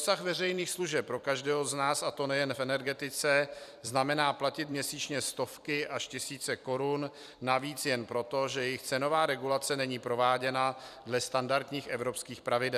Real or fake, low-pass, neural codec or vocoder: fake; 14.4 kHz; vocoder, 44.1 kHz, 128 mel bands every 256 samples, BigVGAN v2